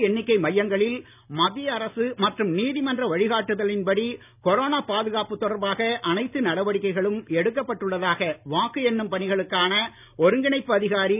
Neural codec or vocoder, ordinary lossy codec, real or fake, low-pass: none; none; real; 3.6 kHz